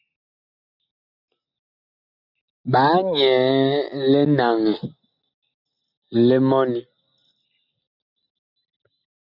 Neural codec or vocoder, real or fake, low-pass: none; real; 5.4 kHz